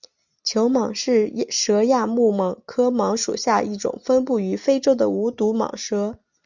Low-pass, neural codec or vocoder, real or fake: 7.2 kHz; none; real